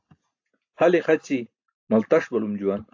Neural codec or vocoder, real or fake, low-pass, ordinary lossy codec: none; real; 7.2 kHz; AAC, 48 kbps